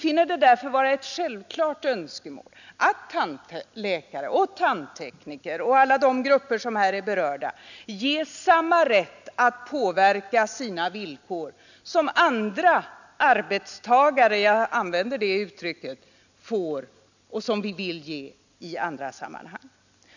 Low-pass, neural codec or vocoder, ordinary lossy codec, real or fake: 7.2 kHz; none; none; real